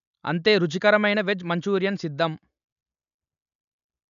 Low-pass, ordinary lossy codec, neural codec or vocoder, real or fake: 7.2 kHz; AAC, 96 kbps; none; real